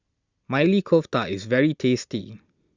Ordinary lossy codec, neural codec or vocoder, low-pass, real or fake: Opus, 64 kbps; none; 7.2 kHz; real